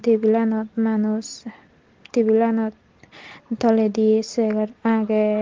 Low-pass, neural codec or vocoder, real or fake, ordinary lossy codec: 7.2 kHz; none; real; Opus, 16 kbps